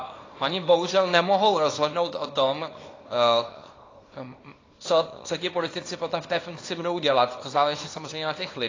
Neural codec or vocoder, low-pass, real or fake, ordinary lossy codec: codec, 24 kHz, 0.9 kbps, WavTokenizer, small release; 7.2 kHz; fake; AAC, 32 kbps